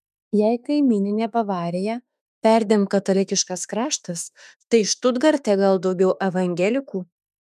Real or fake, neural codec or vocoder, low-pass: fake; autoencoder, 48 kHz, 32 numbers a frame, DAC-VAE, trained on Japanese speech; 14.4 kHz